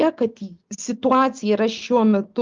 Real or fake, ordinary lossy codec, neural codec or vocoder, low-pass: real; Opus, 32 kbps; none; 7.2 kHz